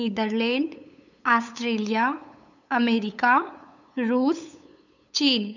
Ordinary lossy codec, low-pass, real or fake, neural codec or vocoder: none; 7.2 kHz; fake; codec, 16 kHz, 8 kbps, FunCodec, trained on LibriTTS, 25 frames a second